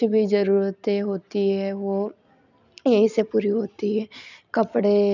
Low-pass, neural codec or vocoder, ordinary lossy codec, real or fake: 7.2 kHz; vocoder, 44.1 kHz, 128 mel bands every 256 samples, BigVGAN v2; none; fake